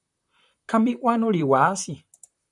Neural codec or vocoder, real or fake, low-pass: vocoder, 44.1 kHz, 128 mel bands, Pupu-Vocoder; fake; 10.8 kHz